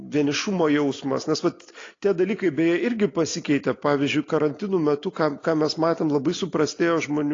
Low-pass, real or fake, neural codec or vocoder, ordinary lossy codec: 7.2 kHz; real; none; AAC, 32 kbps